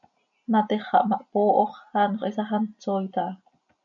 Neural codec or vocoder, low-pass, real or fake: none; 7.2 kHz; real